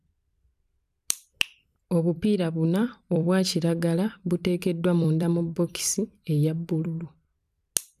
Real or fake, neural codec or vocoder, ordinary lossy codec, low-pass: fake; vocoder, 44.1 kHz, 128 mel bands every 256 samples, BigVGAN v2; none; 14.4 kHz